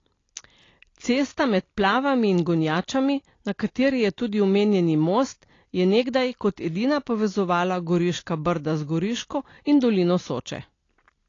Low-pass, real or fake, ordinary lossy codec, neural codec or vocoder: 7.2 kHz; real; AAC, 32 kbps; none